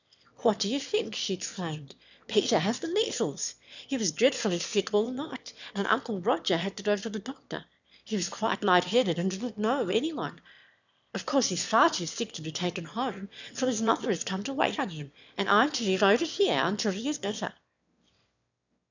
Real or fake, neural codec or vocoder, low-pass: fake; autoencoder, 22.05 kHz, a latent of 192 numbers a frame, VITS, trained on one speaker; 7.2 kHz